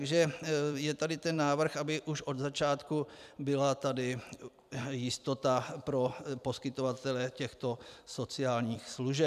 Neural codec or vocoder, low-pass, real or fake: vocoder, 44.1 kHz, 128 mel bands every 256 samples, BigVGAN v2; 14.4 kHz; fake